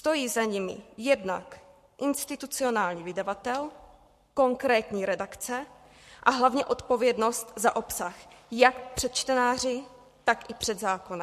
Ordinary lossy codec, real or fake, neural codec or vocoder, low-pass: MP3, 64 kbps; fake; codec, 44.1 kHz, 7.8 kbps, Pupu-Codec; 14.4 kHz